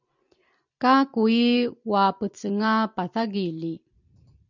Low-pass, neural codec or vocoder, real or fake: 7.2 kHz; vocoder, 44.1 kHz, 128 mel bands every 256 samples, BigVGAN v2; fake